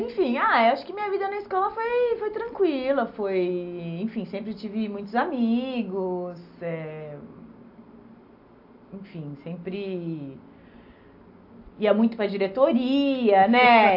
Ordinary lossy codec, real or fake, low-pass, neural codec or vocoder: AAC, 48 kbps; real; 5.4 kHz; none